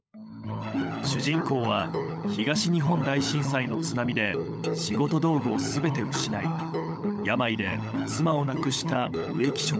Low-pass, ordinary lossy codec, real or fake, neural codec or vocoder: none; none; fake; codec, 16 kHz, 16 kbps, FunCodec, trained on LibriTTS, 50 frames a second